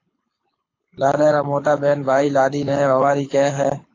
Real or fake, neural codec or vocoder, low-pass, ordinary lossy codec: fake; codec, 24 kHz, 6 kbps, HILCodec; 7.2 kHz; AAC, 32 kbps